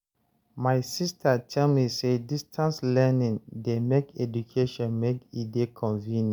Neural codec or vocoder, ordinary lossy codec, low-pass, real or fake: none; none; none; real